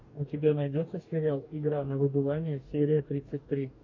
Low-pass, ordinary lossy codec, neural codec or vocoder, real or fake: 7.2 kHz; AAC, 48 kbps; codec, 44.1 kHz, 2.6 kbps, DAC; fake